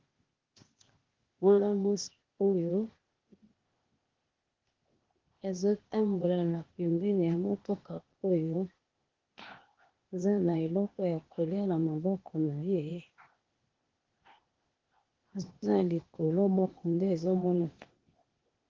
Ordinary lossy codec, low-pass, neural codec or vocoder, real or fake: Opus, 16 kbps; 7.2 kHz; codec, 16 kHz, 0.8 kbps, ZipCodec; fake